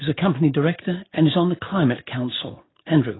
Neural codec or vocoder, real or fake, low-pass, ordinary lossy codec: none; real; 7.2 kHz; AAC, 16 kbps